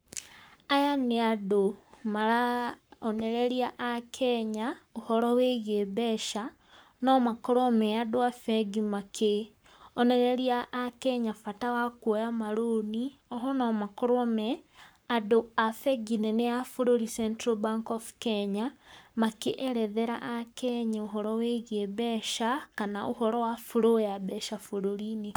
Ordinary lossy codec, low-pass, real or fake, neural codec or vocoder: none; none; fake; codec, 44.1 kHz, 7.8 kbps, Pupu-Codec